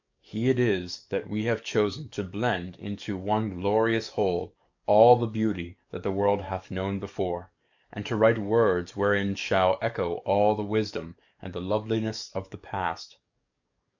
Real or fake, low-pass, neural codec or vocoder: fake; 7.2 kHz; codec, 44.1 kHz, 7.8 kbps, DAC